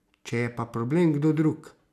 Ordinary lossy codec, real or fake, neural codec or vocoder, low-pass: none; real; none; 14.4 kHz